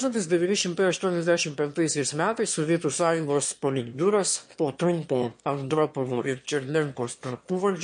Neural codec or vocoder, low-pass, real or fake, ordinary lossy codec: autoencoder, 22.05 kHz, a latent of 192 numbers a frame, VITS, trained on one speaker; 9.9 kHz; fake; MP3, 48 kbps